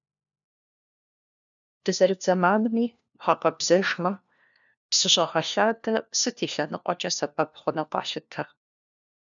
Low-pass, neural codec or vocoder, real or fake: 7.2 kHz; codec, 16 kHz, 1 kbps, FunCodec, trained on LibriTTS, 50 frames a second; fake